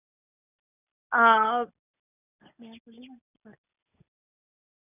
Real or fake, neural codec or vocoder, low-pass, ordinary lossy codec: real; none; 3.6 kHz; none